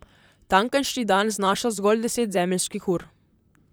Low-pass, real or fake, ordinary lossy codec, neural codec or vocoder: none; fake; none; vocoder, 44.1 kHz, 128 mel bands, Pupu-Vocoder